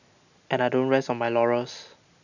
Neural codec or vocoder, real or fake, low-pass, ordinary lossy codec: none; real; 7.2 kHz; none